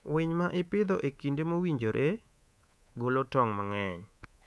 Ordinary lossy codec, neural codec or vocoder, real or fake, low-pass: none; codec, 24 kHz, 3.1 kbps, DualCodec; fake; none